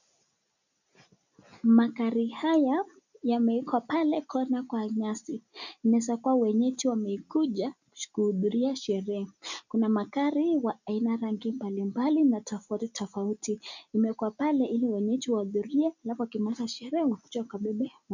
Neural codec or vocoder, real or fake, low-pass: none; real; 7.2 kHz